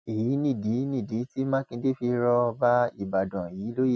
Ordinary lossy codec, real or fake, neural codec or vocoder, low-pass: none; real; none; none